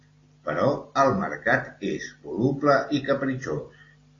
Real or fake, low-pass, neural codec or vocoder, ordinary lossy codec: real; 7.2 kHz; none; AAC, 32 kbps